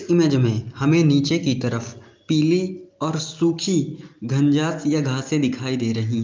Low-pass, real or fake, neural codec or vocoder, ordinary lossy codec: 7.2 kHz; real; none; Opus, 24 kbps